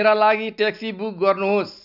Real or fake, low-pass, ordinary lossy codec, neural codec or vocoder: real; 5.4 kHz; none; none